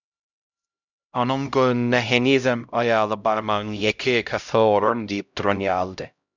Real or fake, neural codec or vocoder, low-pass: fake; codec, 16 kHz, 0.5 kbps, X-Codec, HuBERT features, trained on LibriSpeech; 7.2 kHz